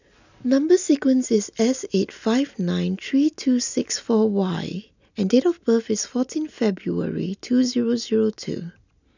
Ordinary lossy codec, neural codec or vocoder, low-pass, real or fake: none; none; 7.2 kHz; real